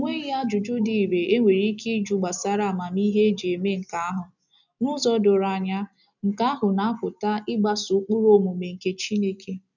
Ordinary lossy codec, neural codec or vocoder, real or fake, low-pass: none; none; real; 7.2 kHz